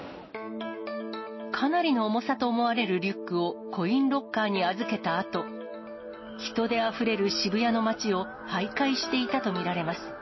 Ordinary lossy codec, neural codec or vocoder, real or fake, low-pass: MP3, 24 kbps; none; real; 7.2 kHz